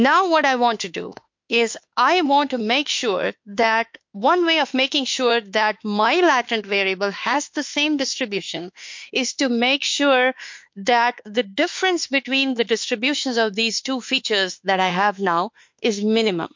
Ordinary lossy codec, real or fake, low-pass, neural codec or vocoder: MP3, 48 kbps; fake; 7.2 kHz; autoencoder, 48 kHz, 32 numbers a frame, DAC-VAE, trained on Japanese speech